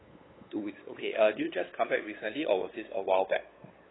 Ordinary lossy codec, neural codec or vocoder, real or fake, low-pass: AAC, 16 kbps; codec, 16 kHz, 8 kbps, FunCodec, trained on LibriTTS, 25 frames a second; fake; 7.2 kHz